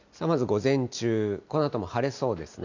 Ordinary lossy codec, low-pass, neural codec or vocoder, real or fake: none; 7.2 kHz; none; real